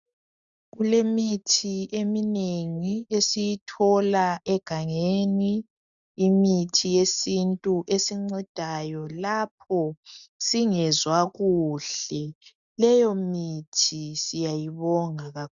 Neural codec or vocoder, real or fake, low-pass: none; real; 7.2 kHz